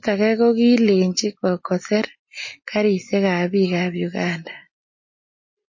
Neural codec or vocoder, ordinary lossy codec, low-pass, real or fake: none; MP3, 32 kbps; 7.2 kHz; real